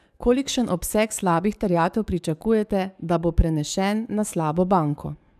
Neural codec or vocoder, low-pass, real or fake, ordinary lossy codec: codec, 44.1 kHz, 7.8 kbps, DAC; 14.4 kHz; fake; none